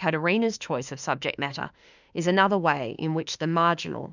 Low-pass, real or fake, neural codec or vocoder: 7.2 kHz; fake; autoencoder, 48 kHz, 32 numbers a frame, DAC-VAE, trained on Japanese speech